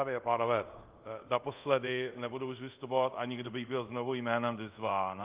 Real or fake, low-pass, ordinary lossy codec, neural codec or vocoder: fake; 3.6 kHz; Opus, 32 kbps; codec, 24 kHz, 0.5 kbps, DualCodec